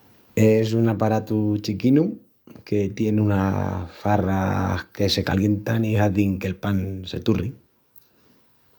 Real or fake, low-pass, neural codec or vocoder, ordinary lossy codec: fake; none; codec, 44.1 kHz, 7.8 kbps, DAC; none